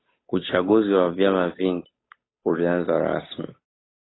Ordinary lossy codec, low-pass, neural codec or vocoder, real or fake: AAC, 16 kbps; 7.2 kHz; codec, 16 kHz, 8 kbps, FunCodec, trained on Chinese and English, 25 frames a second; fake